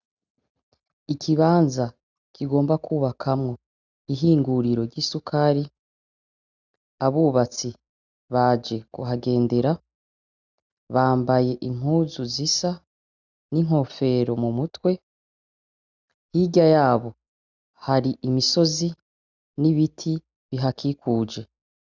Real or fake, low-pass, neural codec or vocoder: real; 7.2 kHz; none